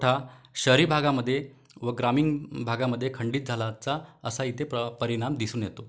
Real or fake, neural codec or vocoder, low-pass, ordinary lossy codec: real; none; none; none